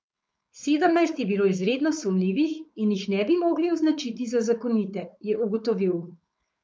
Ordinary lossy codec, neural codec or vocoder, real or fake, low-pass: none; codec, 16 kHz, 4.8 kbps, FACodec; fake; none